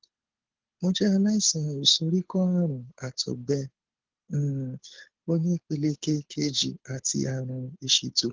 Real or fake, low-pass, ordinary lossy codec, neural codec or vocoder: fake; 7.2 kHz; Opus, 16 kbps; codec, 24 kHz, 6 kbps, HILCodec